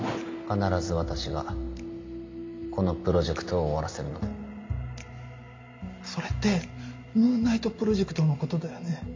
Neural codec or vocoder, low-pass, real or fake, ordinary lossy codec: none; 7.2 kHz; real; MP3, 48 kbps